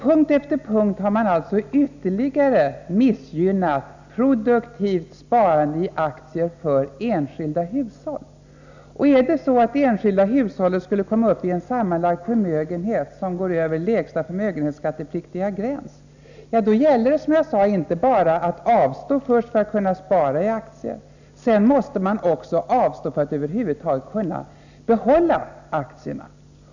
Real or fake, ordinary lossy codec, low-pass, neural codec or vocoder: real; none; 7.2 kHz; none